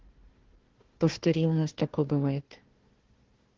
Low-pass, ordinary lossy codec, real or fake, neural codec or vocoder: 7.2 kHz; Opus, 16 kbps; fake; codec, 16 kHz, 1 kbps, FunCodec, trained on Chinese and English, 50 frames a second